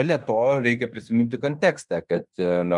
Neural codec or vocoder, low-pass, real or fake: codec, 16 kHz in and 24 kHz out, 0.9 kbps, LongCat-Audio-Codec, fine tuned four codebook decoder; 10.8 kHz; fake